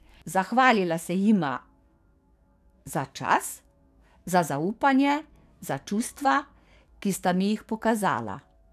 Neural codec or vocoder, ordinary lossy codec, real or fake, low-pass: codec, 44.1 kHz, 7.8 kbps, DAC; none; fake; 14.4 kHz